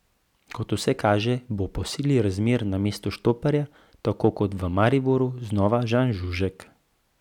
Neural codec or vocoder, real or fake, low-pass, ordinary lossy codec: none; real; 19.8 kHz; none